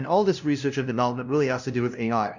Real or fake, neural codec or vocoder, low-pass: fake; codec, 16 kHz, 0.5 kbps, FunCodec, trained on LibriTTS, 25 frames a second; 7.2 kHz